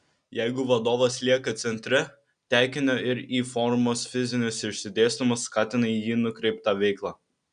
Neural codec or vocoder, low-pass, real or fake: none; 9.9 kHz; real